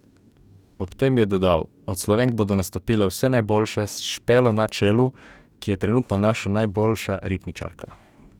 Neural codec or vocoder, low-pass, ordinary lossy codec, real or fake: codec, 44.1 kHz, 2.6 kbps, DAC; 19.8 kHz; none; fake